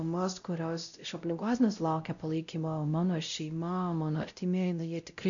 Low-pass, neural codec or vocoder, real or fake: 7.2 kHz; codec, 16 kHz, 0.5 kbps, X-Codec, WavLM features, trained on Multilingual LibriSpeech; fake